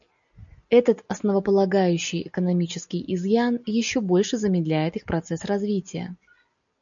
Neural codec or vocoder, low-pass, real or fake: none; 7.2 kHz; real